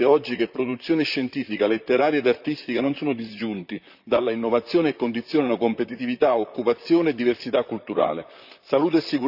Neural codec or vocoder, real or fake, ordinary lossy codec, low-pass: vocoder, 44.1 kHz, 128 mel bands, Pupu-Vocoder; fake; none; 5.4 kHz